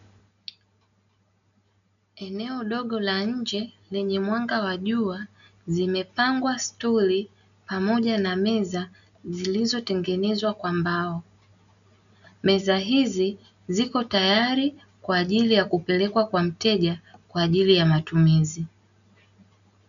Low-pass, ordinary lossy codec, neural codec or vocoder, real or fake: 7.2 kHz; MP3, 96 kbps; none; real